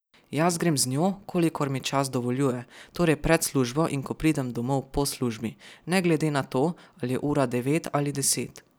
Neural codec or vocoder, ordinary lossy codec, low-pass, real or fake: none; none; none; real